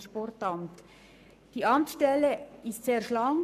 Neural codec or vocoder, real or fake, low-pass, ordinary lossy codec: codec, 44.1 kHz, 7.8 kbps, Pupu-Codec; fake; 14.4 kHz; none